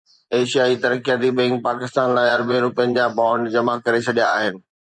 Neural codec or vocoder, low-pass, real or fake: vocoder, 24 kHz, 100 mel bands, Vocos; 10.8 kHz; fake